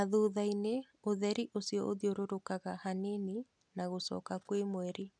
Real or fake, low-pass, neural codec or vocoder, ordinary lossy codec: real; 9.9 kHz; none; none